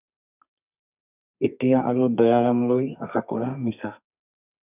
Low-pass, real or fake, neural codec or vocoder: 3.6 kHz; fake; codec, 32 kHz, 1.9 kbps, SNAC